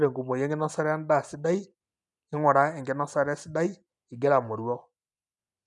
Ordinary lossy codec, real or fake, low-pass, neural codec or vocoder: none; real; 10.8 kHz; none